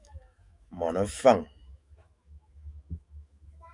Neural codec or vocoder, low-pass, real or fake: autoencoder, 48 kHz, 128 numbers a frame, DAC-VAE, trained on Japanese speech; 10.8 kHz; fake